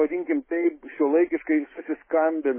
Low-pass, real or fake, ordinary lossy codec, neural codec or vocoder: 3.6 kHz; fake; MP3, 16 kbps; autoencoder, 48 kHz, 128 numbers a frame, DAC-VAE, trained on Japanese speech